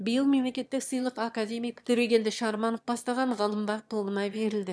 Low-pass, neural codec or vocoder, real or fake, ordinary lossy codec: none; autoencoder, 22.05 kHz, a latent of 192 numbers a frame, VITS, trained on one speaker; fake; none